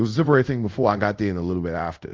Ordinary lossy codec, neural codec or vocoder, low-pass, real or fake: Opus, 24 kbps; codec, 24 kHz, 0.5 kbps, DualCodec; 7.2 kHz; fake